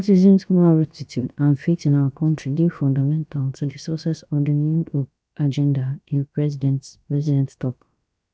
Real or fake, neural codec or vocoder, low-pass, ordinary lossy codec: fake; codec, 16 kHz, about 1 kbps, DyCAST, with the encoder's durations; none; none